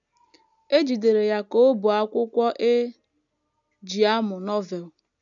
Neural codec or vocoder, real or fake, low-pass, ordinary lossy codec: none; real; 7.2 kHz; none